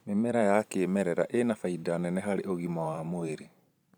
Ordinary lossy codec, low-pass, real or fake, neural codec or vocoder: none; none; fake; vocoder, 44.1 kHz, 128 mel bands every 512 samples, BigVGAN v2